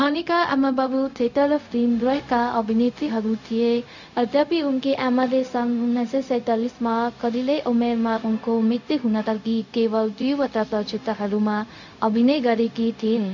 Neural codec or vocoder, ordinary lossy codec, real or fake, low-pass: codec, 16 kHz, 0.4 kbps, LongCat-Audio-Codec; none; fake; 7.2 kHz